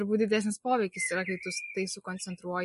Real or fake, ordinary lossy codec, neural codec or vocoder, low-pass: real; MP3, 48 kbps; none; 14.4 kHz